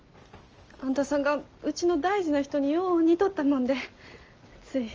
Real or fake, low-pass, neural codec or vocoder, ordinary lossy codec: real; 7.2 kHz; none; Opus, 24 kbps